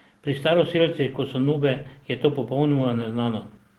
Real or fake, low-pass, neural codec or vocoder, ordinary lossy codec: fake; 19.8 kHz; vocoder, 44.1 kHz, 128 mel bands every 512 samples, BigVGAN v2; Opus, 16 kbps